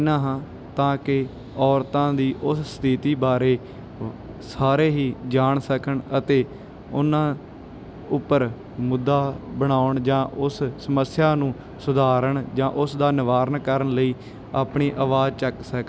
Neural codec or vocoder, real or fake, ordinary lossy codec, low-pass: none; real; none; none